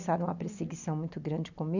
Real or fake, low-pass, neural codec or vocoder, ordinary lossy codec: real; 7.2 kHz; none; none